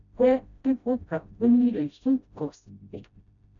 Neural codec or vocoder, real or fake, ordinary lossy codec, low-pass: codec, 16 kHz, 0.5 kbps, FreqCodec, smaller model; fake; MP3, 96 kbps; 7.2 kHz